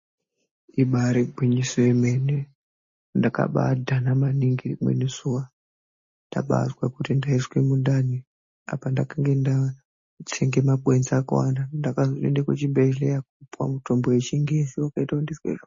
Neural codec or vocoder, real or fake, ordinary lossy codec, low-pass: none; real; MP3, 32 kbps; 7.2 kHz